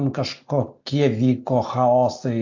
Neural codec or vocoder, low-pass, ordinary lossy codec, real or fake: none; 7.2 kHz; AAC, 48 kbps; real